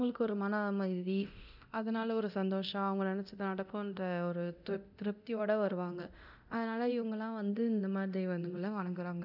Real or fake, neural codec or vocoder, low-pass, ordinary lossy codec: fake; codec, 24 kHz, 0.9 kbps, DualCodec; 5.4 kHz; none